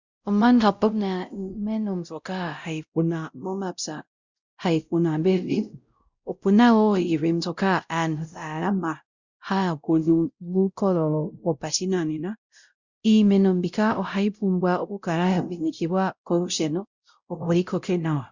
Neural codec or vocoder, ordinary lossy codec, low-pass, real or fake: codec, 16 kHz, 0.5 kbps, X-Codec, WavLM features, trained on Multilingual LibriSpeech; Opus, 64 kbps; 7.2 kHz; fake